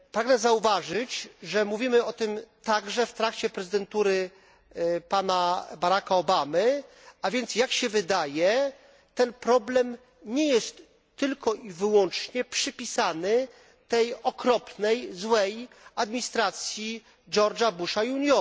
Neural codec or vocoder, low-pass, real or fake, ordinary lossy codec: none; none; real; none